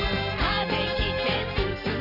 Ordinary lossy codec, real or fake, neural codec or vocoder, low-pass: AAC, 48 kbps; real; none; 5.4 kHz